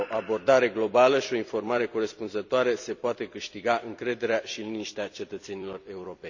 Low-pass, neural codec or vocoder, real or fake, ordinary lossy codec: 7.2 kHz; vocoder, 44.1 kHz, 128 mel bands every 256 samples, BigVGAN v2; fake; none